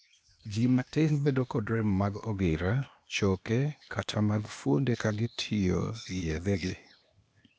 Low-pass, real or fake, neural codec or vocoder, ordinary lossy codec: none; fake; codec, 16 kHz, 0.8 kbps, ZipCodec; none